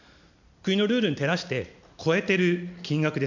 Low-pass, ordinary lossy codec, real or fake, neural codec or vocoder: 7.2 kHz; none; real; none